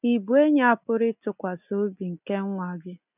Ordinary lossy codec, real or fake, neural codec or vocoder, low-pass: none; real; none; 3.6 kHz